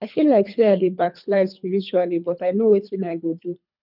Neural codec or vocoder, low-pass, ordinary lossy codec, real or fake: codec, 24 kHz, 3 kbps, HILCodec; 5.4 kHz; none; fake